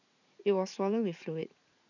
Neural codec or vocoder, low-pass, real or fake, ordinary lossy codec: vocoder, 44.1 kHz, 80 mel bands, Vocos; 7.2 kHz; fake; none